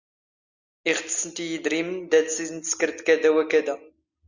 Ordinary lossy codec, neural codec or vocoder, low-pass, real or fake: Opus, 64 kbps; none; 7.2 kHz; real